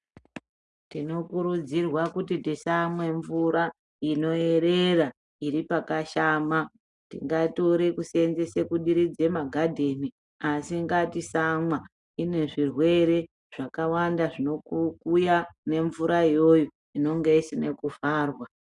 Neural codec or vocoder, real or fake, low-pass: none; real; 10.8 kHz